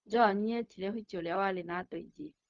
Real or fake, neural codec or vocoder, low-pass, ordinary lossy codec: fake; codec, 16 kHz, 0.4 kbps, LongCat-Audio-Codec; 7.2 kHz; Opus, 32 kbps